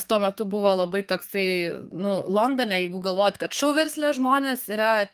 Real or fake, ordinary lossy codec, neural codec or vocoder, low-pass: fake; Opus, 32 kbps; codec, 32 kHz, 1.9 kbps, SNAC; 14.4 kHz